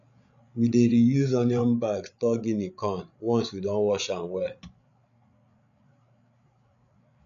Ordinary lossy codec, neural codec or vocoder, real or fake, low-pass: none; codec, 16 kHz, 8 kbps, FreqCodec, larger model; fake; 7.2 kHz